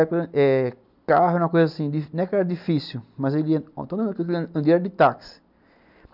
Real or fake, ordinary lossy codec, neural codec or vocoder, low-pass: real; none; none; 5.4 kHz